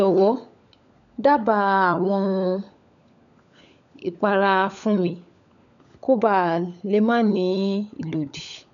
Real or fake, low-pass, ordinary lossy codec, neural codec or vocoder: fake; 7.2 kHz; none; codec, 16 kHz, 16 kbps, FunCodec, trained on LibriTTS, 50 frames a second